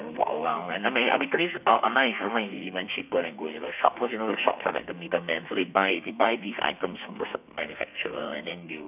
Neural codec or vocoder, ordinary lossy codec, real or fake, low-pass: codec, 32 kHz, 1.9 kbps, SNAC; none; fake; 3.6 kHz